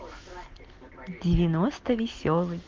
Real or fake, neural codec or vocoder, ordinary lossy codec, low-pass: real; none; Opus, 16 kbps; 7.2 kHz